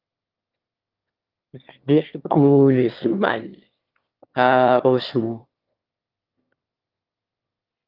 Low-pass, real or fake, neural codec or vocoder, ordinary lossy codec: 5.4 kHz; fake; autoencoder, 22.05 kHz, a latent of 192 numbers a frame, VITS, trained on one speaker; Opus, 32 kbps